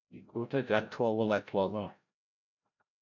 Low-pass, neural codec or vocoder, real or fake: 7.2 kHz; codec, 16 kHz, 0.5 kbps, FreqCodec, larger model; fake